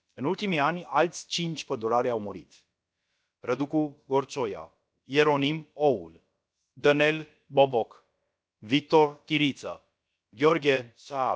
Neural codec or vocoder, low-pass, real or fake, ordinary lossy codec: codec, 16 kHz, about 1 kbps, DyCAST, with the encoder's durations; none; fake; none